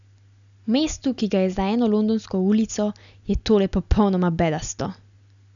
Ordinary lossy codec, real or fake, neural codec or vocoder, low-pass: none; real; none; 7.2 kHz